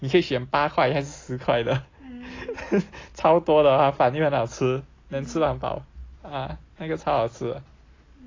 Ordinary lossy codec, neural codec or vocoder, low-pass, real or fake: AAC, 32 kbps; none; 7.2 kHz; real